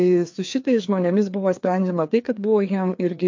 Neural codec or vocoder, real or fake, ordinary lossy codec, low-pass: codec, 16 kHz, 2 kbps, FreqCodec, larger model; fake; MP3, 48 kbps; 7.2 kHz